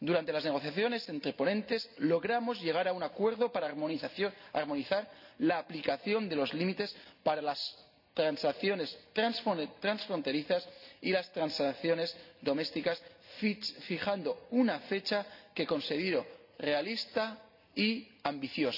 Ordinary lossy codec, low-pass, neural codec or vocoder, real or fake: none; 5.4 kHz; none; real